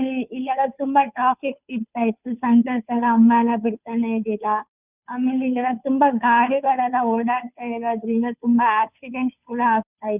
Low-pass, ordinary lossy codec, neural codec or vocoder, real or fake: 3.6 kHz; none; codec, 16 kHz, 2 kbps, FunCodec, trained on Chinese and English, 25 frames a second; fake